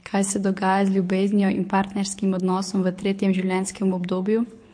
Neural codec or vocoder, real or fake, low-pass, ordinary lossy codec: codec, 24 kHz, 6 kbps, HILCodec; fake; 9.9 kHz; MP3, 48 kbps